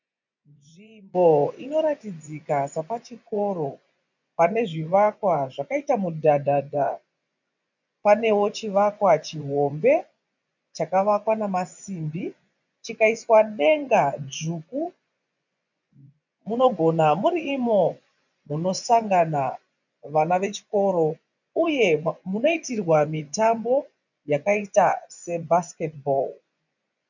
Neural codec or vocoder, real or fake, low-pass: vocoder, 24 kHz, 100 mel bands, Vocos; fake; 7.2 kHz